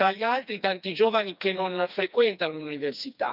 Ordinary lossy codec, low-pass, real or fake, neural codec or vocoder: none; 5.4 kHz; fake; codec, 16 kHz, 2 kbps, FreqCodec, smaller model